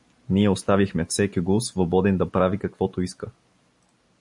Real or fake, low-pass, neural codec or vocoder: real; 10.8 kHz; none